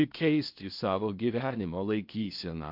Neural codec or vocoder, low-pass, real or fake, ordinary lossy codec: codec, 16 kHz in and 24 kHz out, 0.8 kbps, FocalCodec, streaming, 65536 codes; 5.4 kHz; fake; MP3, 48 kbps